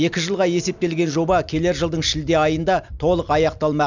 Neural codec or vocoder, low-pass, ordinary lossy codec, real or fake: none; 7.2 kHz; none; real